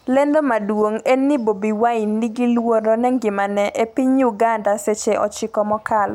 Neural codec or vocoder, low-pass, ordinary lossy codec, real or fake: none; 19.8 kHz; none; real